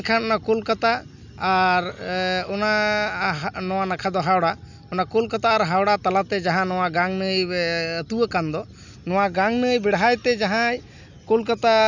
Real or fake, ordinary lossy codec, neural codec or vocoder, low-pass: real; none; none; 7.2 kHz